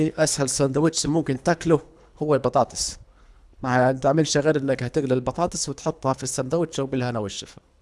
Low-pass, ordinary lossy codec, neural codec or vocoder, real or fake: none; none; codec, 24 kHz, 3 kbps, HILCodec; fake